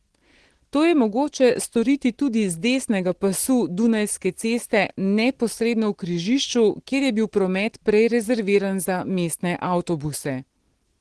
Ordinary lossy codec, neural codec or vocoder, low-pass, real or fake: Opus, 16 kbps; none; 10.8 kHz; real